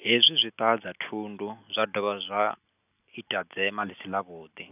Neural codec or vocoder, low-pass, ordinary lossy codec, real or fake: none; 3.6 kHz; none; real